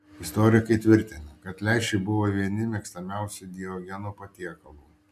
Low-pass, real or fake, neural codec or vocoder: 14.4 kHz; real; none